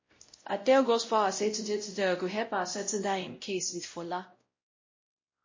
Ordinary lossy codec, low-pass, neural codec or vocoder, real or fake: MP3, 32 kbps; 7.2 kHz; codec, 16 kHz, 0.5 kbps, X-Codec, WavLM features, trained on Multilingual LibriSpeech; fake